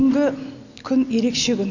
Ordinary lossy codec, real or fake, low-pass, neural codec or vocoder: none; real; 7.2 kHz; none